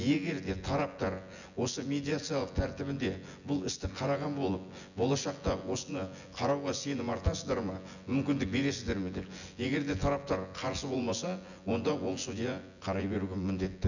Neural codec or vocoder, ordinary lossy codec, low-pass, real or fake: vocoder, 24 kHz, 100 mel bands, Vocos; none; 7.2 kHz; fake